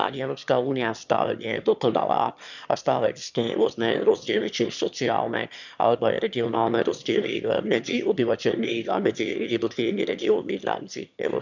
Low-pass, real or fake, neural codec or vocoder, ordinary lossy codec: 7.2 kHz; fake; autoencoder, 22.05 kHz, a latent of 192 numbers a frame, VITS, trained on one speaker; none